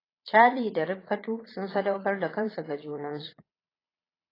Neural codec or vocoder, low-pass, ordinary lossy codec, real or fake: vocoder, 22.05 kHz, 80 mel bands, Vocos; 5.4 kHz; AAC, 24 kbps; fake